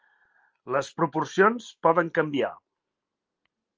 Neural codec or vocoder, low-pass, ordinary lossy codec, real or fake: none; 7.2 kHz; Opus, 24 kbps; real